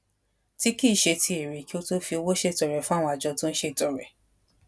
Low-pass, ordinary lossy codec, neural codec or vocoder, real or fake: none; none; none; real